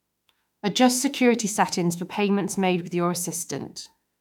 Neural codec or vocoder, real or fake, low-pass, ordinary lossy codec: autoencoder, 48 kHz, 32 numbers a frame, DAC-VAE, trained on Japanese speech; fake; 19.8 kHz; none